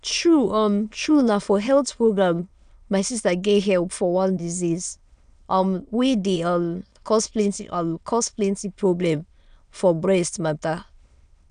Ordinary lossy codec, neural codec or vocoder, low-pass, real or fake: none; autoencoder, 22.05 kHz, a latent of 192 numbers a frame, VITS, trained on many speakers; 9.9 kHz; fake